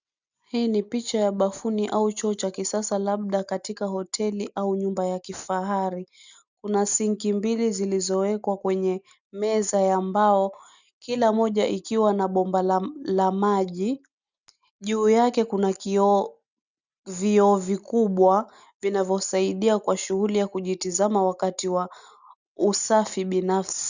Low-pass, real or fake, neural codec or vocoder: 7.2 kHz; real; none